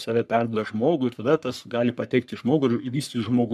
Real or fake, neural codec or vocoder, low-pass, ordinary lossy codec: fake; codec, 44.1 kHz, 3.4 kbps, Pupu-Codec; 14.4 kHz; MP3, 96 kbps